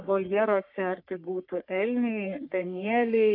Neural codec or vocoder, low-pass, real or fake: codec, 44.1 kHz, 3.4 kbps, Pupu-Codec; 5.4 kHz; fake